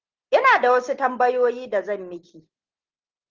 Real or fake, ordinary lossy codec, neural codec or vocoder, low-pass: real; Opus, 16 kbps; none; 7.2 kHz